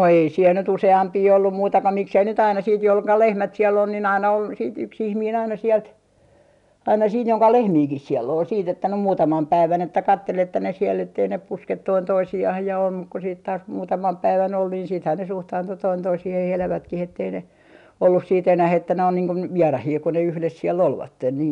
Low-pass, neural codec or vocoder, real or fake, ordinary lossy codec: 10.8 kHz; none; real; none